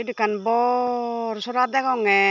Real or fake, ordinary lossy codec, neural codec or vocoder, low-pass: real; none; none; 7.2 kHz